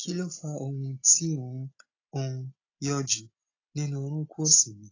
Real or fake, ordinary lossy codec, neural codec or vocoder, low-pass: real; AAC, 32 kbps; none; 7.2 kHz